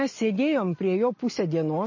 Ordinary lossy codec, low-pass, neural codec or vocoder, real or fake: MP3, 32 kbps; 7.2 kHz; none; real